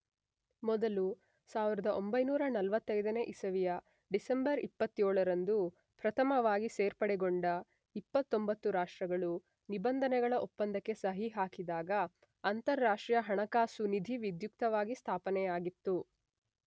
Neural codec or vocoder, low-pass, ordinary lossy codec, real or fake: none; none; none; real